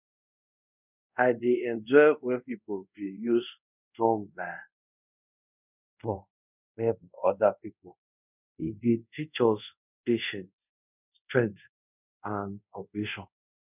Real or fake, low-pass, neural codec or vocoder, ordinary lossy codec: fake; 3.6 kHz; codec, 24 kHz, 0.5 kbps, DualCodec; none